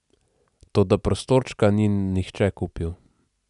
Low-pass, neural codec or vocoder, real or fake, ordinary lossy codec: 10.8 kHz; none; real; none